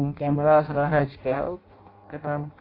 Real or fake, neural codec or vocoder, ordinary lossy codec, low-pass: fake; codec, 16 kHz in and 24 kHz out, 0.6 kbps, FireRedTTS-2 codec; AAC, 32 kbps; 5.4 kHz